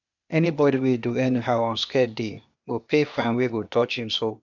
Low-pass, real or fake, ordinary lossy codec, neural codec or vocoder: 7.2 kHz; fake; none; codec, 16 kHz, 0.8 kbps, ZipCodec